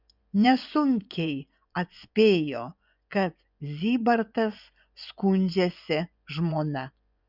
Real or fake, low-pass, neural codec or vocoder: fake; 5.4 kHz; vocoder, 24 kHz, 100 mel bands, Vocos